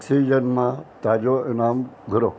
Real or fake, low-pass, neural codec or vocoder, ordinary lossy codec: real; none; none; none